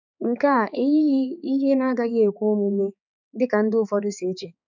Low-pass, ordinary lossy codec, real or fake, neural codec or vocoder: 7.2 kHz; none; fake; codec, 16 kHz, 4 kbps, X-Codec, HuBERT features, trained on balanced general audio